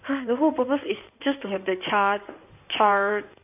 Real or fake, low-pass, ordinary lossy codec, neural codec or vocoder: fake; 3.6 kHz; none; codec, 16 kHz in and 24 kHz out, 2.2 kbps, FireRedTTS-2 codec